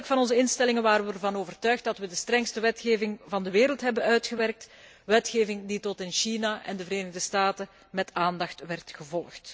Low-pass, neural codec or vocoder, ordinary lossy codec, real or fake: none; none; none; real